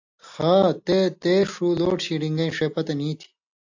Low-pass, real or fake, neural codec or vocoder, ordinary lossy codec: 7.2 kHz; real; none; AAC, 48 kbps